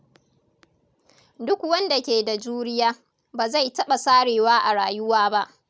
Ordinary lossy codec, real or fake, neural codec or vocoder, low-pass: none; real; none; none